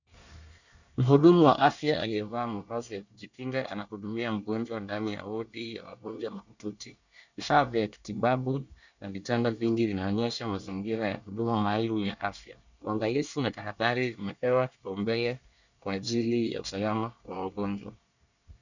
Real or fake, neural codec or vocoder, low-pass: fake; codec, 24 kHz, 1 kbps, SNAC; 7.2 kHz